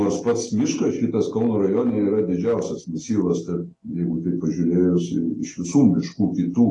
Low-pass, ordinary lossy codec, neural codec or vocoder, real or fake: 10.8 kHz; AAC, 32 kbps; none; real